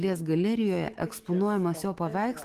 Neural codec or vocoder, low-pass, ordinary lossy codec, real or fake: autoencoder, 48 kHz, 128 numbers a frame, DAC-VAE, trained on Japanese speech; 14.4 kHz; Opus, 16 kbps; fake